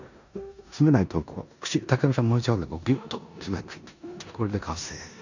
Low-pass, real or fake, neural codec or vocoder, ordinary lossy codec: 7.2 kHz; fake; codec, 16 kHz in and 24 kHz out, 0.9 kbps, LongCat-Audio-Codec, four codebook decoder; none